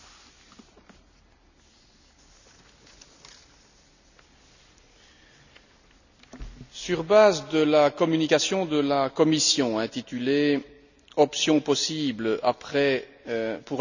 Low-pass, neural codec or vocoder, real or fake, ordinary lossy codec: 7.2 kHz; none; real; none